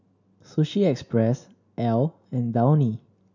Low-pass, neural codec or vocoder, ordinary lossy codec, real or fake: 7.2 kHz; none; none; real